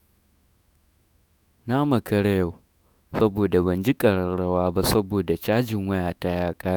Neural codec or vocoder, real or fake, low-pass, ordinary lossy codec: autoencoder, 48 kHz, 128 numbers a frame, DAC-VAE, trained on Japanese speech; fake; none; none